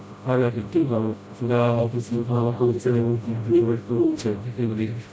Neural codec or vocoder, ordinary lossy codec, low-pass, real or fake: codec, 16 kHz, 0.5 kbps, FreqCodec, smaller model; none; none; fake